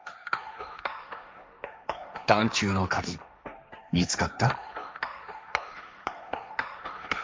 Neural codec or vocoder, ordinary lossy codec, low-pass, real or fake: codec, 16 kHz, 1.1 kbps, Voila-Tokenizer; none; none; fake